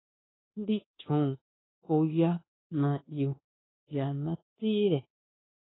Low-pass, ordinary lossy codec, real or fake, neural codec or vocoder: 7.2 kHz; AAC, 16 kbps; fake; codec, 24 kHz, 1.2 kbps, DualCodec